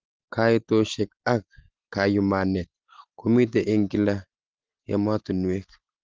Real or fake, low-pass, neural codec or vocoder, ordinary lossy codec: real; 7.2 kHz; none; Opus, 16 kbps